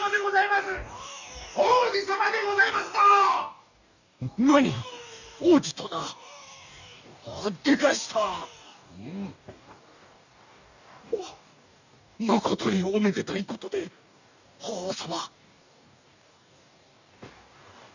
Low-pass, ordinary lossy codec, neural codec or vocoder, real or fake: 7.2 kHz; none; codec, 44.1 kHz, 2.6 kbps, DAC; fake